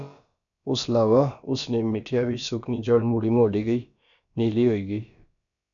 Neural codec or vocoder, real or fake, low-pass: codec, 16 kHz, about 1 kbps, DyCAST, with the encoder's durations; fake; 7.2 kHz